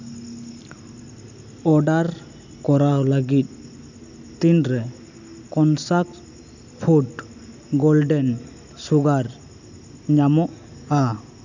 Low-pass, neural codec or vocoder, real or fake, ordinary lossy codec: 7.2 kHz; none; real; none